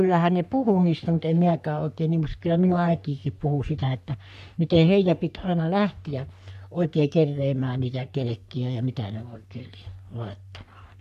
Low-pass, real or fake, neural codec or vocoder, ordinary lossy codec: 14.4 kHz; fake; codec, 44.1 kHz, 3.4 kbps, Pupu-Codec; none